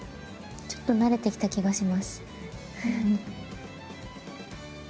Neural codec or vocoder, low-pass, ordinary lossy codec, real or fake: none; none; none; real